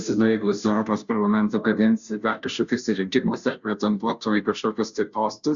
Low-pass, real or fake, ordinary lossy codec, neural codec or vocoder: 7.2 kHz; fake; Opus, 64 kbps; codec, 16 kHz, 0.5 kbps, FunCodec, trained on Chinese and English, 25 frames a second